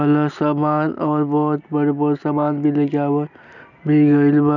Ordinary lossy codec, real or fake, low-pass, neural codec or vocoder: none; real; 7.2 kHz; none